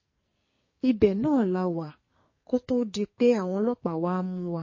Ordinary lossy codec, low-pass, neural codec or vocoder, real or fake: MP3, 32 kbps; 7.2 kHz; codec, 44.1 kHz, 2.6 kbps, SNAC; fake